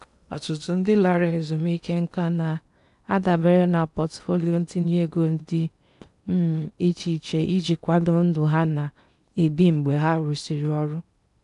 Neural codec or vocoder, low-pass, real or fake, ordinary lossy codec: codec, 16 kHz in and 24 kHz out, 0.8 kbps, FocalCodec, streaming, 65536 codes; 10.8 kHz; fake; none